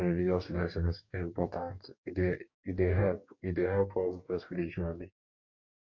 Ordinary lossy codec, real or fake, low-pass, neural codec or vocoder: MP3, 48 kbps; fake; 7.2 kHz; codec, 44.1 kHz, 2.6 kbps, DAC